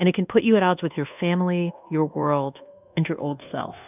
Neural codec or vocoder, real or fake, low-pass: codec, 24 kHz, 1.2 kbps, DualCodec; fake; 3.6 kHz